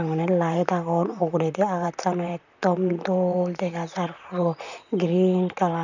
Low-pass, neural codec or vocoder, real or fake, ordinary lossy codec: 7.2 kHz; vocoder, 44.1 kHz, 128 mel bands, Pupu-Vocoder; fake; none